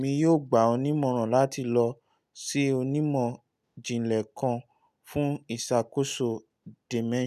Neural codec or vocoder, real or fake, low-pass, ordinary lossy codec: none; real; 14.4 kHz; none